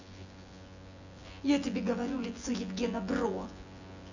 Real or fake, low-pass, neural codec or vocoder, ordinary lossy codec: fake; 7.2 kHz; vocoder, 24 kHz, 100 mel bands, Vocos; none